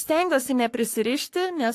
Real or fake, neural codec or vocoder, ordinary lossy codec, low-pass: fake; codec, 44.1 kHz, 3.4 kbps, Pupu-Codec; AAC, 64 kbps; 14.4 kHz